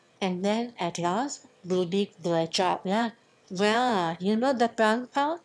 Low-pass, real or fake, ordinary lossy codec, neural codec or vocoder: none; fake; none; autoencoder, 22.05 kHz, a latent of 192 numbers a frame, VITS, trained on one speaker